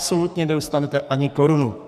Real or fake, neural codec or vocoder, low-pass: fake; codec, 44.1 kHz, 2.6 kbps, SNAC; 14.4 kHz